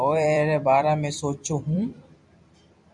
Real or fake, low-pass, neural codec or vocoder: fake; 10.8 kHz; vocoder, 44.1 kHz, 128 mel bands every 256 samples, BigVGAN v2